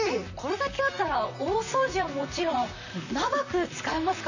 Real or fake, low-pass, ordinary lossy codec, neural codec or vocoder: fake; 7.2 kHz; none; vocoder, 44.1 kHz, 128 mel bands, Pupu-Vocoder